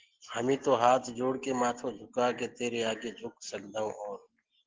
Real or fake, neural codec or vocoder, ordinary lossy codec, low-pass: real; none; Opus, 16 kbps; 7.2 kHz